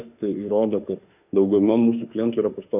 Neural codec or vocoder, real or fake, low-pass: autoencoder, 48 kHz, 32 numbers a frame, DAC-VAE, trained on Japanese speech; fake; 3.6 kHz